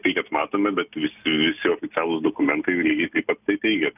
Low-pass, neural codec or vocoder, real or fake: 3.6 kHz; none; real